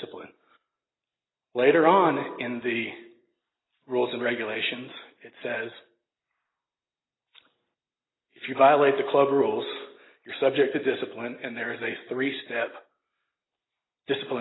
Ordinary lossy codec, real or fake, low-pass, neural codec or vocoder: AAC, 16 kbps; real; 7.2 kHz; none